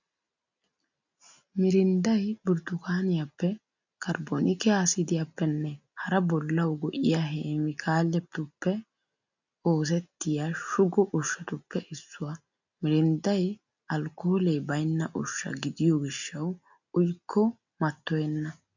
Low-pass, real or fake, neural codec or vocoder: 7.2 kHz; real; none